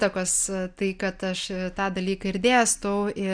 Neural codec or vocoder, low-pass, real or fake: none; 9.9 kHz; real